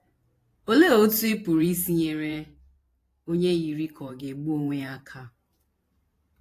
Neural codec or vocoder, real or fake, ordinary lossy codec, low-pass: vocoder, 44.1 kHz, 128 mel bands every 512 samples, BigVGAN v2; fake; AAC, 48 kbps; 14.4 kHz